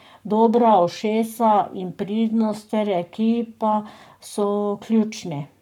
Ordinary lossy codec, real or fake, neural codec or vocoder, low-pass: none; fake; codec, 44.1 kHz, 7.8 kbps, Pupu-Codec; 19.8 kHz